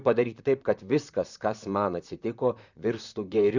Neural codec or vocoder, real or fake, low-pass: vocoder, 44.1 kHz, 128 mel bands, Pupu-Vocoder; fake; 7.2 kHz